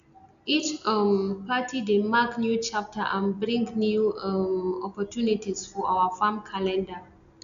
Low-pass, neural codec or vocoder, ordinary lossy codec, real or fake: 7.2 kHz; none; AAC, 96 kbps; real